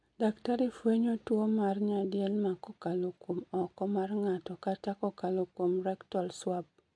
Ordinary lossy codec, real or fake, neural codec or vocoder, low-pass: MP3, 64 kbps; real; none; 9.9 kHz